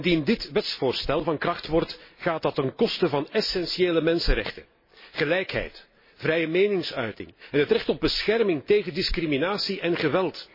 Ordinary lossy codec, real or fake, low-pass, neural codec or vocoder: MP3, 24 kbps; real; 5.4 kHz; none